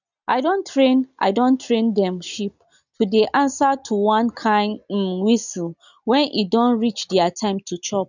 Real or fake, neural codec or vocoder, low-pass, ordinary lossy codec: real; none; 7.2 kHz; none